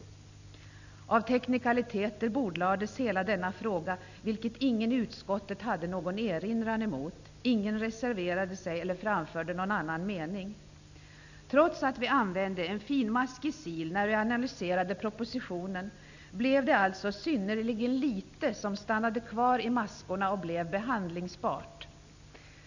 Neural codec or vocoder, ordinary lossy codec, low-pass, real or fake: none; none; 7.2 kHz; real